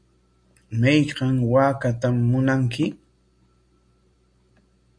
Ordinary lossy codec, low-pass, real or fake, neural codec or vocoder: MP3, 48 kbps; 9.9 kHz; real; none